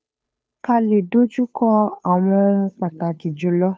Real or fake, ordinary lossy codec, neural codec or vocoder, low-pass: fake; none; codec, 16 kHz, 2 kbps, FunCodec, trained on Chinese and English, 25 frames a second; none